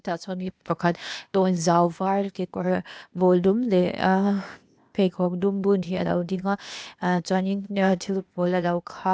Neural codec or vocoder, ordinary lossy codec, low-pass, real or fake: codec, 16 kHz, 0.8 kbps, ZipCodec; none; none; fake